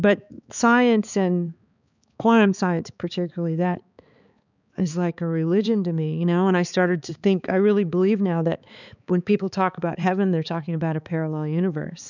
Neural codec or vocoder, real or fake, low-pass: codec, 16 kHz, 4 kbps, X-Codec, HuBERT features, trained on balanced general audio; fake; 7.2 kHz